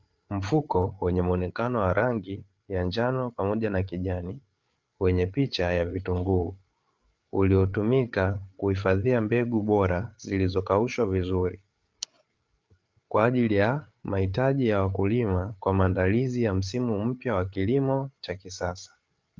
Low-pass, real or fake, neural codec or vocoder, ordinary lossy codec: 7.2 kHz; fake; codec, 16 kHz, 8 kbps, FreqCodec, larger model; Opus, 32 kbps